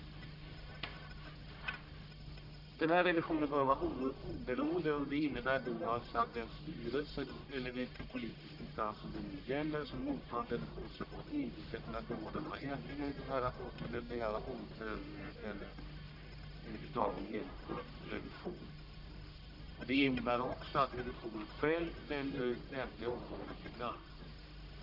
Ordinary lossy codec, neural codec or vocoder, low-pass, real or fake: none; codec, 44.1 kHz, 1.7 kbps, Pupu-Codec; 5.4 kHz; fake